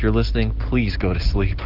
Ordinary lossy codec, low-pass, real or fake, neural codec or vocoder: Opus, 16 kbps; 5.4 kHz; real; none